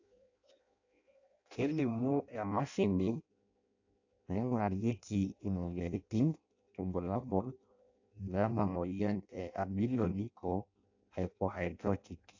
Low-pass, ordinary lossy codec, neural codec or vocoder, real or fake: 7.2 kHz; AAC, 48 kbps; codec, 16 kHz in and 24 kHz out, 0.6 kbps, FireRedTTS-2 codec; fake